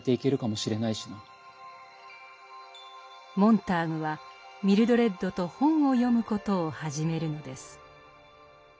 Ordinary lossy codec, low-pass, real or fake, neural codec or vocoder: none; none; real; none